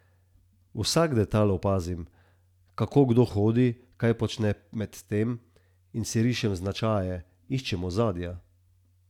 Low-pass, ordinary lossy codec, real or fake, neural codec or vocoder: 19.8 kHz; none; real; none